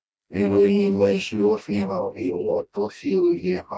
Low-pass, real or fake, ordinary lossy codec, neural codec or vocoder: none; fake; none; codec, 16 kHz, 1 kbps, FreqCodec, smaller model